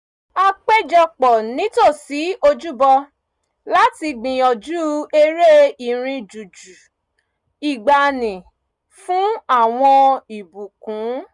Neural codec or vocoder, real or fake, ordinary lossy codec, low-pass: none; real; none; 10.8 kHz